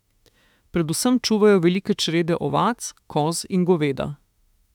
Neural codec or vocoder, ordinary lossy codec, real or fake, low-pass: autoencoder, 48 kHz, 32 numbers a frame, DAC-VAE, trained on Japanese speech; none; fake; 19.8 kHz